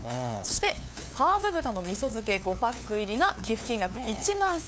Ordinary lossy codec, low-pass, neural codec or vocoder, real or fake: none; none; codec, 16 kHz, 2 kbps, FunCodec, trained on LibriTTS, 25 frames a second; fake